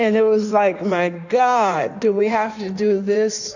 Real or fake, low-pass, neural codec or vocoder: fake; 7.2 kHz; codec, 16 kHz in and 24 kHz out, 1.1 kbps, FireRedTTS-2 codec